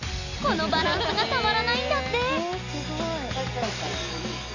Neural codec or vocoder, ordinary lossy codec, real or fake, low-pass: none; none; real; 7.2 kHz